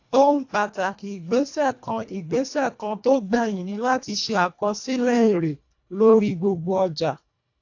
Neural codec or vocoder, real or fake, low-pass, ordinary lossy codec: codec, 24 kHz, 1.5 kbps, HILCodec; fake; 7.2 kHz; AAC, 48 kbps